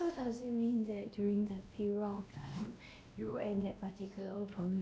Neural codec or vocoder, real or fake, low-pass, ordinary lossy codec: codec, 16 kHz, 1 kbps, X-Codec, WavLM features, trained on Multilingual LibriSpeech; fake; none; none